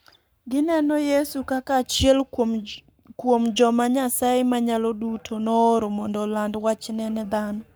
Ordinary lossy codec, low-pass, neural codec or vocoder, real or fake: none; none; codec, 44.1 kHz, 7.8 kbps, Pupu-Codec; fake